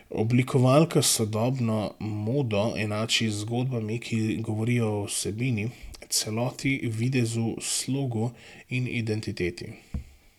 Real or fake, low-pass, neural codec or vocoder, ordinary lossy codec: real; 19.8 kHz; none; none